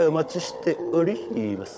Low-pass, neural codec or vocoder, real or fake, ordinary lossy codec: none; codec, 16 kHz, 16 kbps, FunCodec, trained on Chinese and English, 50 frames a second; fake; none